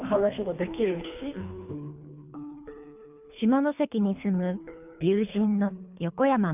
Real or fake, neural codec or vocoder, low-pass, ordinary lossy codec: fake; codec, 24 kHz, 3 kbps, HILCodec; 3.6 kHz; none